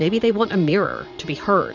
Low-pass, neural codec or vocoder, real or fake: 7.2 kHz; vocoder, 44.1 kHz, 80 mel bands, Vocos; fake